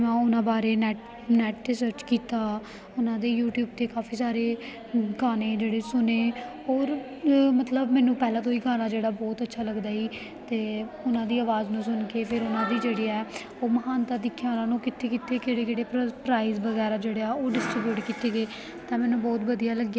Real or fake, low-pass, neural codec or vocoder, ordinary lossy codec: real; none; none; none